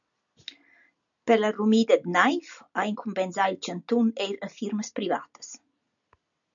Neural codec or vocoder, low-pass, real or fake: none; 7.2 kHz; real